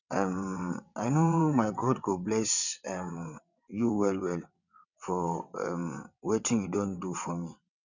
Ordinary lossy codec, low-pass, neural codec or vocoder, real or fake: none; 7.2 kHz; vocoder, 22.05 kHz, 80 mel bands, WaveNeXt; fake